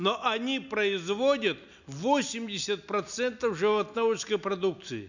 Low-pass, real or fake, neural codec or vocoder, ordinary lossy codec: 7.2 kHz; real; none; none